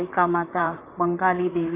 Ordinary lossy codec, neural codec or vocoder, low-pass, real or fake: AAC, 24 kbps; vocoder, 44.1 kHz, 128 mel bands, Pupu-Vocoder; 3.6 kHz; fake